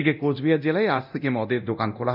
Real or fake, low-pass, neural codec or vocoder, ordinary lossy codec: fake; 5.4 kHz; codec, 24 kHz, 0.9 kbps, DualCodec; none